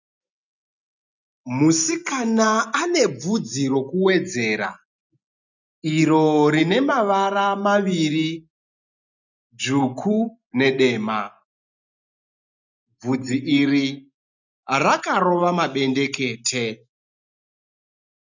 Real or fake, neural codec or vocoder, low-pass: real; none; 7.2 kHz